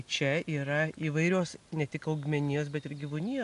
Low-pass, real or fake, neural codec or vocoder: 10.8 kHz; real; none